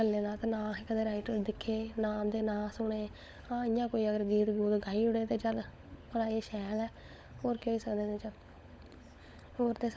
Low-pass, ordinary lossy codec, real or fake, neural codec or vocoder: none; none; fake; codec, 16 kHz, 16 kbps, FunCodec, trained on LibriTTS, 50 frames a second